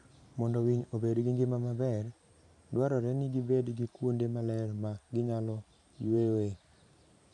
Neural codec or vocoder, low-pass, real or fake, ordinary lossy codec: vocoder, 44.1 kHz, 128 mel bands every 512 samples, BigVGAN v2; 10.8 kHz; fake; AAC, 64 kbps